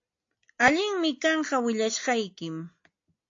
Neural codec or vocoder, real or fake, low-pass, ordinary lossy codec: none; real; 7.2 kHz; AAC, 48 kbps